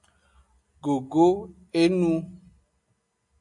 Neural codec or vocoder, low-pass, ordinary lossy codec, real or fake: none; 10.8 kHz; MP3, 64 kbps; real